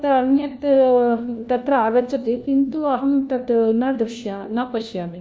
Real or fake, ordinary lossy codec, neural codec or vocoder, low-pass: fake; none; codec, 16 kHz, 1 kbps, FunCodec, trained on LibriTTS, 50 frames a second; none